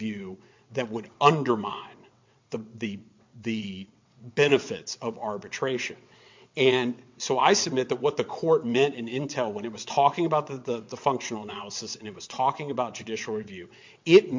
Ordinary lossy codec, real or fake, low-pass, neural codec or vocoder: MP3, 48 kbps; fake; 7.2 kHz; vocoder, 22.05 kHz, 80 mel bands, WaveNeXt